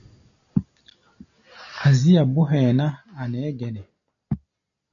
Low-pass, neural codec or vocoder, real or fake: 7.2 kHz; none; real